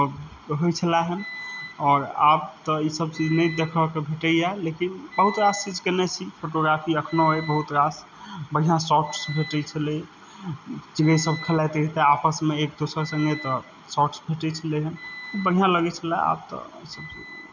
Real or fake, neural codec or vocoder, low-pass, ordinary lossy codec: real; none; 7.2 kHz; none